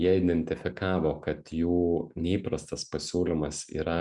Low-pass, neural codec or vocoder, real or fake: 10.8 kHz; none; real